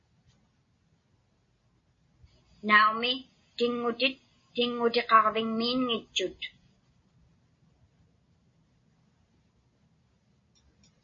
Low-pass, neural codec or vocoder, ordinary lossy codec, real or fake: 7.2 kHz; none; MP3, 32 kbps; real